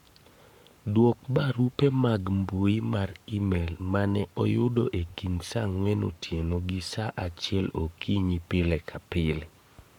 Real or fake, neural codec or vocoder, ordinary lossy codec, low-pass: fake; codec, 44.1 kHz, 7.8 kbps, Pupu-Codec; none; 19.8 kHz